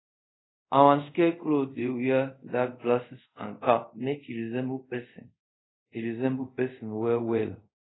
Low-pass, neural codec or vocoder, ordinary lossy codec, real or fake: 7.2 kHz; codec, 24 kHz, 0.5 kbps, DualCodec; AAC, 16 kbps; fake